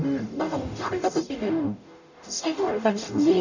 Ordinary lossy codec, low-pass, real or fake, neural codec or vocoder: none; 7.2 kHz; fake; codec, 44.1 kHz, 0.9 kbps, DAC